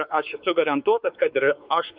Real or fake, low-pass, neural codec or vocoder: fake; 5.4 kHz; codec, 16 kHz, 4 kbps, X-Codec, WavLM features, trained on Multilingual LibriSpeech